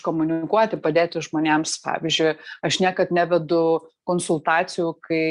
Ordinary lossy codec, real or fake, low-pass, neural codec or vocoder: Opus, 64 kbps; real; 10.8 kHz; none